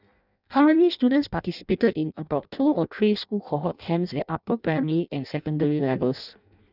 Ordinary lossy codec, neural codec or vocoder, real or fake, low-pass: none; codec, 16 kHz in and 24 kHz out, 0.6 kbps, FireRedTTS-2 codec; fake; 5.4 kHz